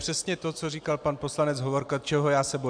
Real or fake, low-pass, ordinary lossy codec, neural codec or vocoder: real; 9.9 kHz; AAC, 64 kbps; none